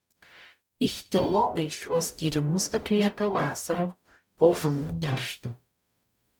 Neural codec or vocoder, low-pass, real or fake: codec, 44.1 kHz, 0.9 kbps, DAC; 19.8 kHz; fake